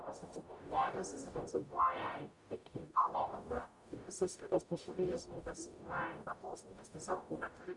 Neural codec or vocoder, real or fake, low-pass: codec, 44.1 kHz, 0.9 kbps, DAC; fake; 10.8 kHz